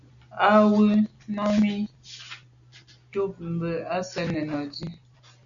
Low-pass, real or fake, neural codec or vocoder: 7.2 kHz; real; none